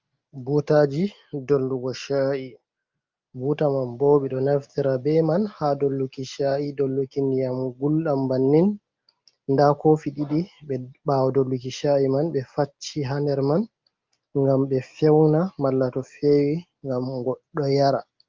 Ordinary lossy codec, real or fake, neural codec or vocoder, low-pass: Opus, 24 kbps; real; none; 7.2 kHz